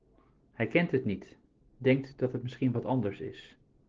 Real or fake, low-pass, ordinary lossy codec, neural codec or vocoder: real; 7.2 kHz; Opus, 16 kbps; none